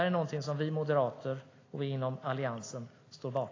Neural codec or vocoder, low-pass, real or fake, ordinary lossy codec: none; 7.2 kHz; real; AAC, 32 kbps